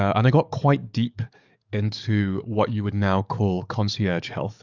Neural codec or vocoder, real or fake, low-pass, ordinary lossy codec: codec, 44.1 kHz, 7.8 kbps, DAC; fake; 7.2 kHz; Opus, 64 kbps